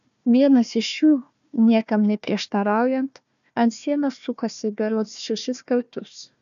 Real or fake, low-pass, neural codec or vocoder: fake; 7.2 kHz; codec, 16 kHz, 1 kbps, FunCodec, trained on Chinese and English, 50 frames a second